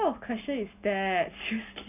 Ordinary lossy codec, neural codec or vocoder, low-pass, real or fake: none; none; 3.6 kHz; real